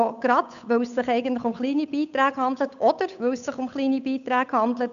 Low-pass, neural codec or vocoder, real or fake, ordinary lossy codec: 7.2 kHz; codec, 16 kHz, 8 kbps, FunCodec, trained on Chinese and English, 25 frames a second; fake; none